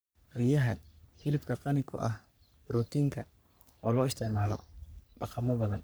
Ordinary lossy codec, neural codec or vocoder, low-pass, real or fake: none; codec, 44.1 kHz, 3.4 kbps, Pupu-Codec; none; fake